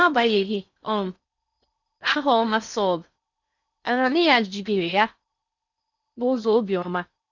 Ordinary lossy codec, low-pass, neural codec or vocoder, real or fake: none; 7.2 kHz; codec, 16 kHz in and 24 kHz out, 0.6 kbps, FocalCodec, streaming, 4096 codes; fake